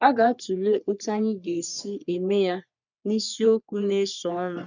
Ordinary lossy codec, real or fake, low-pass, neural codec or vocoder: none; fake; 7.2 kHz; codec, 44.1 kHz, 3.4 kbps, Pupu-Codec